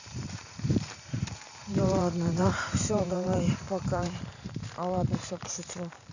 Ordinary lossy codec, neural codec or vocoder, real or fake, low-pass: none; vocoder, 44.1 kHz, 128 mel bands every 512 samples, BigVGAN v2; fake; 7.2 kHz